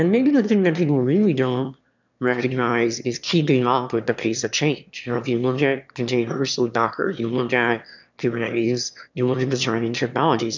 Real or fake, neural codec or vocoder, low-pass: fake; autoencoder, 22.05 kHz, a latent of 192 numbers a frame, VITS, trained on one speaker; 7.2 kHz